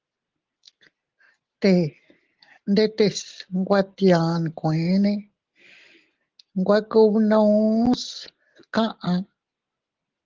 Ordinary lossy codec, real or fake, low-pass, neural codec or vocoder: Opus, 16 kbps; real; 7.2 kHz; none